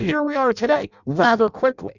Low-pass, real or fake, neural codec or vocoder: 7.2 kHz; fake; codec, 16 kHz in and 24 kHz out, 0.6 kbps, FireRedTTS-2 codec